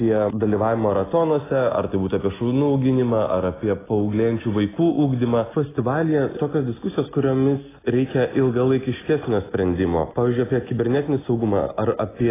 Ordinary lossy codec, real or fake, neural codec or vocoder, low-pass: AAC, 16 kbps; real; none; 3.6 kHz